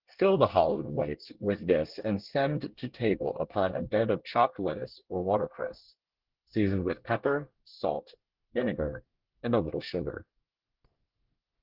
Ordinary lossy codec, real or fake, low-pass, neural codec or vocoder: Opus, 16 kbps; fake; 5.4 kHz; codec, 24 kHz, 1 kbps, SNAC